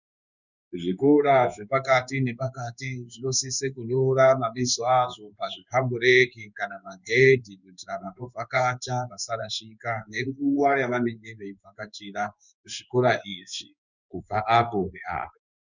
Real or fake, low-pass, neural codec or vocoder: fake; 7.2 kHz; codec, 16 kHz in and 24 kHz out, 1 kbps, XY-Tokenizer